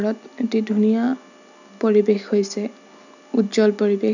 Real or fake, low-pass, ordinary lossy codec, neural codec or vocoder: real; 7.2 kHz; none; none